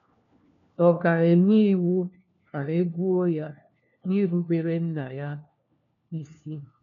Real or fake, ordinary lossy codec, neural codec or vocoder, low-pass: fake; none; codec, 16 kHz, 1 kbps, FunCodec, trained on LibriTTS, 50 frames a second; 7.2 kHz